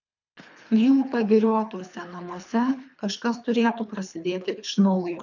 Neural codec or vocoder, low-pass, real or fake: codec, 24 kHz, 3 kbps, HILCodec; 7.2 kHz; fake